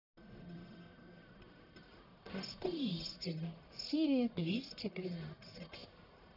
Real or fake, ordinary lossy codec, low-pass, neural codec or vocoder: fake; none; 5.4 kHz; codec, 44.1 kHz, 1.7 kbps, Pupu-Codec